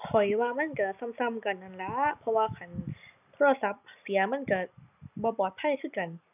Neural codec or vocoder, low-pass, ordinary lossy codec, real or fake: none; 3.6 kHz; none; real